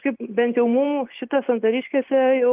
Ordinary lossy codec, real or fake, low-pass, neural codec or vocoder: Opus, 24 kbps; real; 3.6 kHz; none